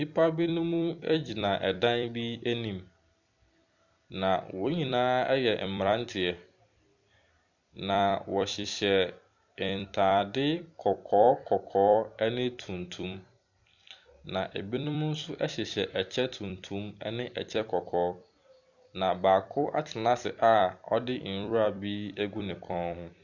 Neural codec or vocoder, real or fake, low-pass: vocoder, 44.1 kHz, 128 mel bands every 256 samples, BigVGAN v2; fake; 7.2 kHz